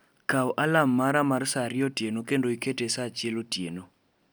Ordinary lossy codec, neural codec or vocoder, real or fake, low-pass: none; none; real; none